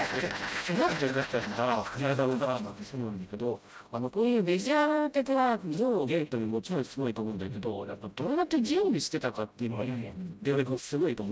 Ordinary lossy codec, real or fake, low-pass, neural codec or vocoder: none; fake; none; codec, 16 kHz, 0.5 kbps, FreqCodec, smaller model